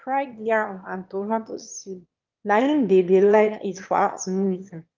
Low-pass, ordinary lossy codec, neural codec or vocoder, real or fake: 7.2 kHz; Opus, 24 kbps; autoencoder, 22.05 kHz, a latent of 192 numbers a frame, VITS, trained on one speaker; fake